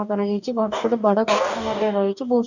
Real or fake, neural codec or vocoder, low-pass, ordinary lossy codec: fake; codec, 44.1 kHz, 2.6 kbps, DAC; 7.2 kHz; none